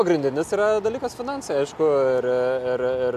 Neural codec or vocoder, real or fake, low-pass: none; real; 14.4 kHz